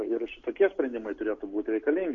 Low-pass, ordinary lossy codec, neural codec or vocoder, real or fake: 7.2 kHz; MP3, 48 kbps; none; real